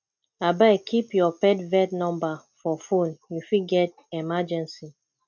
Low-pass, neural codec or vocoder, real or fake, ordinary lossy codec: 7.2 kHz; none; real; MP3, 64 kbps